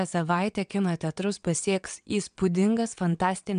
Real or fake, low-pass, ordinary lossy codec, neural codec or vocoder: fake; 9.9 kHz; MP3, 96 kbps; vocoder, 22.05 kHz, 80 mel bands, WaveNeXt